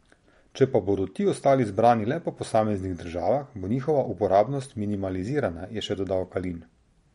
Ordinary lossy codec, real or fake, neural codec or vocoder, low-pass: MP3, 48 kbps; real; none; 19.8 kHz